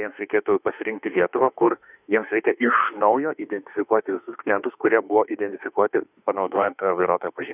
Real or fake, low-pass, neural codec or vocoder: fake; 3.6 kHz; autoencoder, 48 kHz, 32 numbers a frame, DAC-VAE, trained on Japanese speech